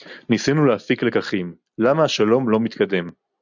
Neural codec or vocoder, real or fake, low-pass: none; real; 7.2 kHz